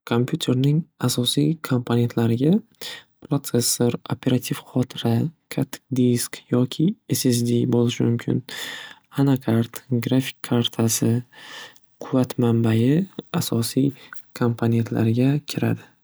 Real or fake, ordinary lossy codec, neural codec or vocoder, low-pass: real; none; none; none